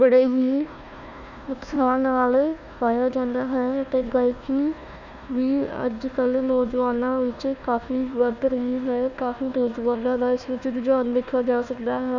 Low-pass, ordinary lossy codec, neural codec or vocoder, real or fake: 7.2 kHz; none; codec, 16 kHz, 1 kbps, FunCodec, trained on Chinese and English, 50 frames a second; fake